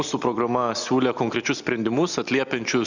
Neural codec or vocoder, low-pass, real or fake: none; 7.2 kHz; real